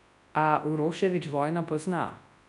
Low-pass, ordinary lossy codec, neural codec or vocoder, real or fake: 10.8 kHz; none; codec, 24 kHz, 0.9 kbps, WavTokenizer, large speech release; fake